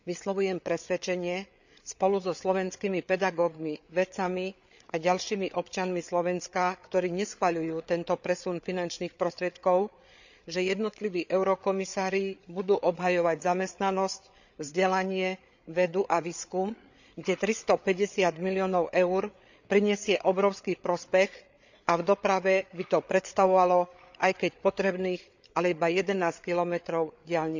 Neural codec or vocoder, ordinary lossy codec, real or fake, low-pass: codec, 16 kHz, 8 kbps, FreqCodec, larger model; none; fake; 7.2 kHz